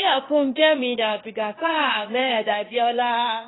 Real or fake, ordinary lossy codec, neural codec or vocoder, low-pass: fake; AAC, 16 kbps; codec, 16 kHz, 0.8 kbps, ZipCodec; 7.2 kHz